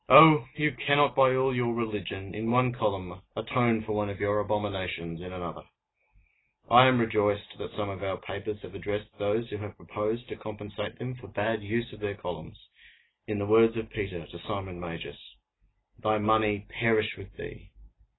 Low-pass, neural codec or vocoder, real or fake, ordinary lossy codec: 7.2 kHz; none; real; AAC, 16 kbps